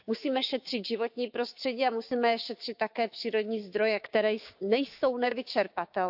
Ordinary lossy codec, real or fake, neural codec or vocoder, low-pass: AAC, 48 kbps; fake; codec, 16 kHz, 6 kbps, DAC; 5.4 kHz